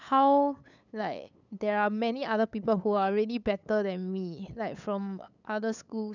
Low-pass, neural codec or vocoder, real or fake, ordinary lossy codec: 7.2 kHz; codec, 16 kHz, 8 kbps, FunCodec, trained on LibriTTS, 25 frames a second; fake; none